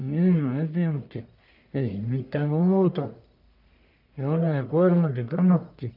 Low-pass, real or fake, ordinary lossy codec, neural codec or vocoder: 5.4 kHz; fake; none; codec, 44.1 kHz, 1.7 kbps, Pupu-Codec